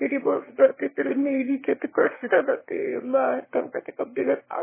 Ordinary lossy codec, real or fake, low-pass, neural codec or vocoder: MP3, 16 kbps; fake; 3.6 kHz; autoencoder, 22.05 kHz, a latent of 192 numbers a frame, VITS, trained on one speaker